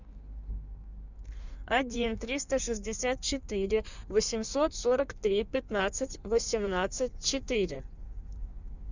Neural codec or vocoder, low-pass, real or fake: codec, 16 kHz in and 24 kHz out, 1.1 kbps, FireRedTTS-2 codec; 7.2 kHz; fake